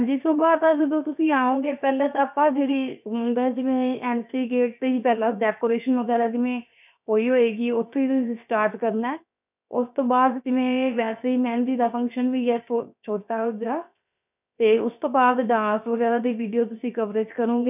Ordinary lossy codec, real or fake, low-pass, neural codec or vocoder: none; fake; 3.6 kHz; codec, 16 kHz, 0.7 kbps, FocalCodec